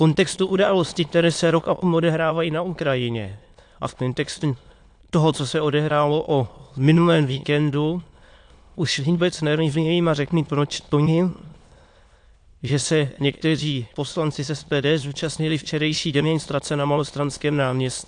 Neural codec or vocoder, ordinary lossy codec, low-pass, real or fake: autoencoder, 22.05 kHz, a latent of 192 numbers a frame, VITS, trained on many speakers; AAC, 64 kbps; 9.9 kHz; fake